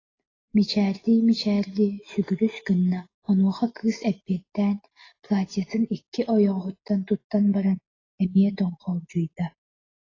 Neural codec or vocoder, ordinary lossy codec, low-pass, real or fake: none; AAC, 32 kbps; 7.2 kHz; real